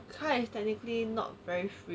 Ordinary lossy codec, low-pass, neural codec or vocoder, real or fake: none; none; none; real